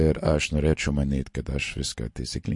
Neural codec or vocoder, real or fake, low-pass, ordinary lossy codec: none; real; 10.8 kHz; MP3, 48 kbps